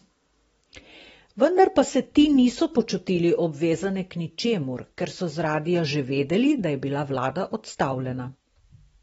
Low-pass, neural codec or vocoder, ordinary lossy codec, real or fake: 19.8 kHz; none; AAC, 24 kbps; real